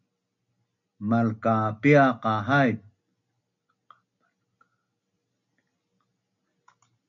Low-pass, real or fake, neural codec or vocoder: 7.2 kHz; real; none